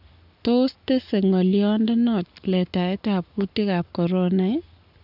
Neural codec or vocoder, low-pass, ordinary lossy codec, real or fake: codec, 44.1 kHz, 7.8 kbps, Pupu-Codec; 5.4 kHz; none; fake